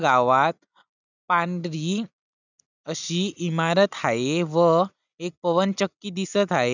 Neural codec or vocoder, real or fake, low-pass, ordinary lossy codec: none; real; 7.2 kHz; none